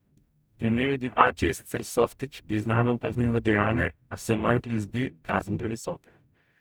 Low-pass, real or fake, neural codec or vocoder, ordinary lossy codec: none; fake; codec, 44.1 kHz, 0.9 kbps, DAC; none